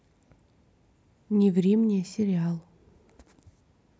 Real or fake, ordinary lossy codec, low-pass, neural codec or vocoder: real; none; none; none